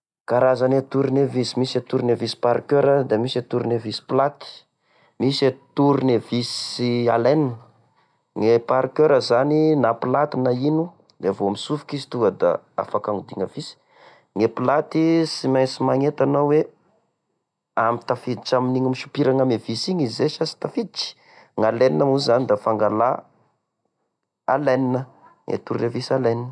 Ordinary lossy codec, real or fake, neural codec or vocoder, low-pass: none; real; none; none